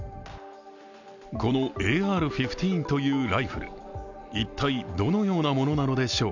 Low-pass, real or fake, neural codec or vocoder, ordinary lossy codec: 7.2 kHz; real; none; none